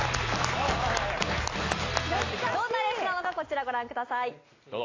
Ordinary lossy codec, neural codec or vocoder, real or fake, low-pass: AAC, 32 kbps; none; real; 7.2 kHz